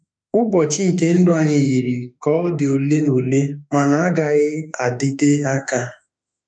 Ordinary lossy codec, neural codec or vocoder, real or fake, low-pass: none; autoencoder, 48 kHz, 32 numbers a frame, DAC-VAE, trained on Japanese speech; fake; 9.9 kHz